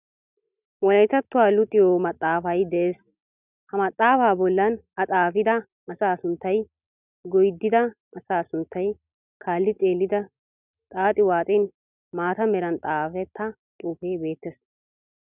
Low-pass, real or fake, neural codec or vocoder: 3.6 kHz; real; none